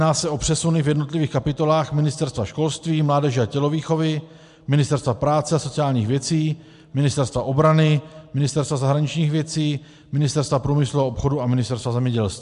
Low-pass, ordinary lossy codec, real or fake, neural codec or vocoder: 10.8 kHz; MP3, 64 kbps; real; none